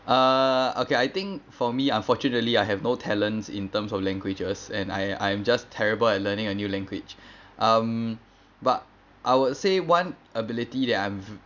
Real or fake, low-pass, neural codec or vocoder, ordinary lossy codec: real; 7.2 kHz; none; none